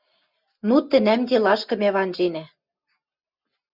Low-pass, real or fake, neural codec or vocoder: 5.4 kHz; real; none